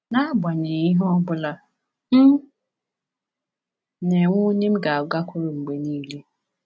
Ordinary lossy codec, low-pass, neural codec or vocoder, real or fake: none; none; none; real